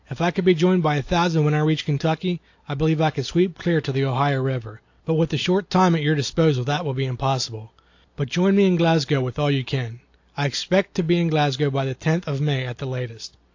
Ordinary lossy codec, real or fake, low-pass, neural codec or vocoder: AAC, 48 kbps; real; 7.2 kHz; none